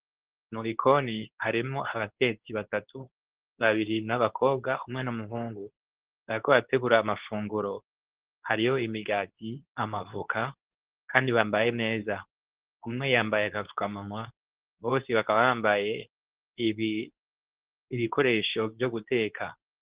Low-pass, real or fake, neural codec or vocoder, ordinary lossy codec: 3.6 kHz; fake; codec, 24 kHz, 0.9 kbps, WavTokenizer, medium speech release version 2; Opus, 16 kbps